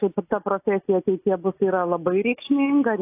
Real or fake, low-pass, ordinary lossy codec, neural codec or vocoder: real; 3.6 kHz; AAC, 24 kbps; none